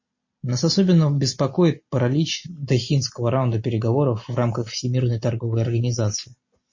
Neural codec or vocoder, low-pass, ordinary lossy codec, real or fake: none; 7.2 kHz; MP3, 32 kbps; real